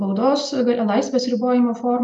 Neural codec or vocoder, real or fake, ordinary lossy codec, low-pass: none; real; MP3, 96 kbps; 10.8 kHz